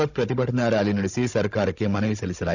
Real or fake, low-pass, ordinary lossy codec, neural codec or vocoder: fake; 7.2 kHz; none; codec, 16 kHz, 16 kbps, FunCodec, trained on Chinese and English, 50 frames a second